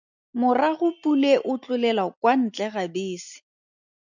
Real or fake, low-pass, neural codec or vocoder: real; 7.2 kHz; none